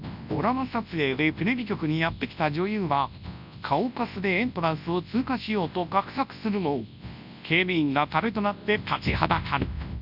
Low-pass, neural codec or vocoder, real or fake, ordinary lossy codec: 5.4 kHz; codec, 24 kHz, 0.9 kbps, WavTokenizer, large speech release; fake; none